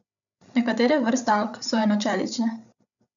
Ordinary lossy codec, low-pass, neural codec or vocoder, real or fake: none; 7.2 kHz; codec, 16 kHz, 8 kbps, FreqCodec, larger model; fake